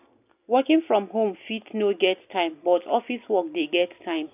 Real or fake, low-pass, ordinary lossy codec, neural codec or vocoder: real; 3.6 kHz; none; none